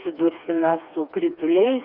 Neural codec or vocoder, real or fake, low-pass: codec, 16 kHz, 2 kbps, FreqCodec, smaller model; fake; 5.4 kHz